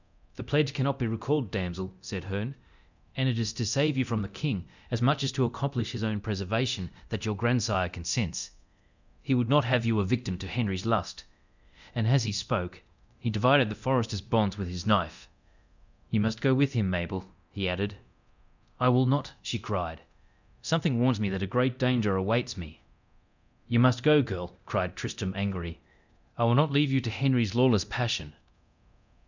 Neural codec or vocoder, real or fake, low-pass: codec, 24 kHz, 0.9 kbps, DualCodec; fake; 7.2 kHz